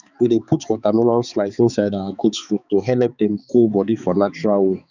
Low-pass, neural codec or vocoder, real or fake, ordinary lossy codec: 7.2 kHz; codec, 16 kHz, 4 kbps, X-Codec, HuBERT features, trained on balanced general audio; fake; none